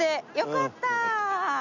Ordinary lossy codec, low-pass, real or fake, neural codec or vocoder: none; 7.2 kHz; real; none